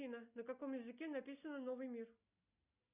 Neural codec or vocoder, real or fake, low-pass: none; real; 3.6 kHz